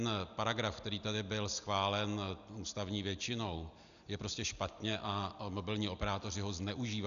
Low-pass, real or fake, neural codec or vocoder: 7.2 kHz; real; none